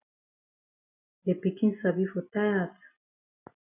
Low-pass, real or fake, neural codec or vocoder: 3.6 kHz; real; none